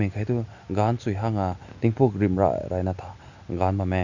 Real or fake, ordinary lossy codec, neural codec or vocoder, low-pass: real; none; none; 7.2 kHz